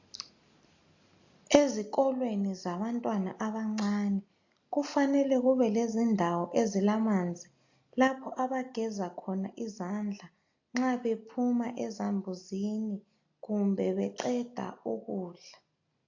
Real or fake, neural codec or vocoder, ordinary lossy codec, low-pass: real; none; AAC, 48 kbps; 7.2 kHz